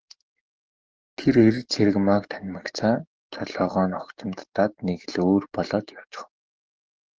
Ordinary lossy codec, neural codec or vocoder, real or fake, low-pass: Opus, 16 kbps; codec, 44.1 kHz, 7.8 kbps, DAC; fake; 7.2 kHz